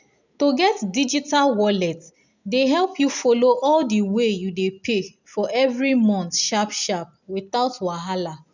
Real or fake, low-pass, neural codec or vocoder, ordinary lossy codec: real; 7.2 kHz; none; none